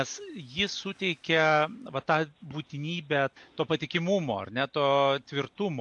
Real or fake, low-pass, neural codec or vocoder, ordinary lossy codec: real; 10.8 kHz; none; AAC, 64 kbps